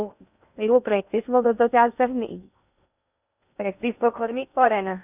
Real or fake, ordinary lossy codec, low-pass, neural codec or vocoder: fake; none; 3.6 kHz; codec, 16 kHz in and 24 kHz out, 0.6 kbps, FocalCodec, streaming, 2048 codes